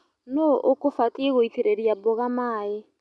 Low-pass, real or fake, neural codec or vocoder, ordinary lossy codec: none; real; none; none